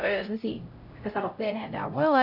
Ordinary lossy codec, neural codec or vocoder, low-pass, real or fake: none; codec, 16 kHz, 0.5 kbps, X-Codec, HuBERT features, trained on LibriSpeech; 5.4 kHz; fake